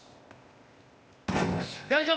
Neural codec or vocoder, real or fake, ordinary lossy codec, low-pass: codec, 16 kHz, 0.8 kbps, ZipCodec; fake; none; none